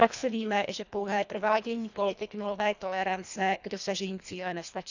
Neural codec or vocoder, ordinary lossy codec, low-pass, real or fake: codec, 24 kHz, 1.5 kbps, HILCodec; none; 7.2 kHz; fake